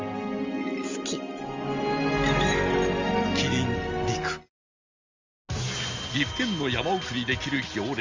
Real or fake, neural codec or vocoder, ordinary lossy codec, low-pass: real; none; Opus, 32 kbps; 7.2 kHz